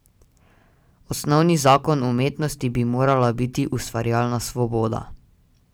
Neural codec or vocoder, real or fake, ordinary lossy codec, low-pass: none; real; none; none